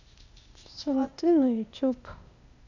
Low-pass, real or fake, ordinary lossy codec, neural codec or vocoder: 7.2 kHz; fake; none; codec, 16 kHz, 0.8 kbps, ZipCodec